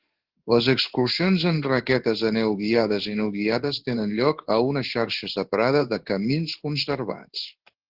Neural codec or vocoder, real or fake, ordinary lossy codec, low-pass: codec, 16 kHz in and 24 kHz out, 1 kbps, XY-Tokenizer; fake; Opus, 16 kbps; 5.4 kHz